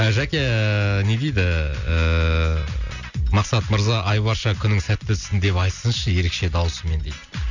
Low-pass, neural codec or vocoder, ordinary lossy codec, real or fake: 7.2 kHz; none; none; real